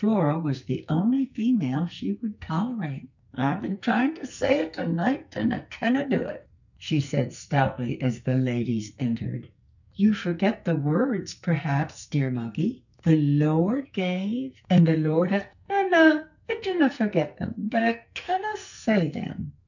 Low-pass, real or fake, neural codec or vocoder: 7.2 kHz; fake; codec, 44.1 kHz, 2.6 kbps, SNAC